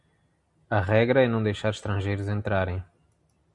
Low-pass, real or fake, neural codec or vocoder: 10.8 kHz; real; none